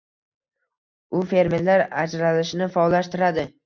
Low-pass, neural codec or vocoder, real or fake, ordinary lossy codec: 7.2 kHz; none; real; MP3, 64 kbps